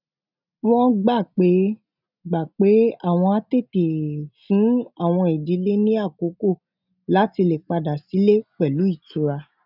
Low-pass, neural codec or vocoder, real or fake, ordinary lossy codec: 5.4 kHz; none; real; none